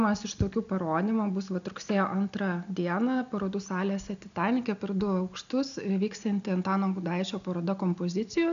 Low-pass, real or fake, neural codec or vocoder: 7.2 kHz; real; none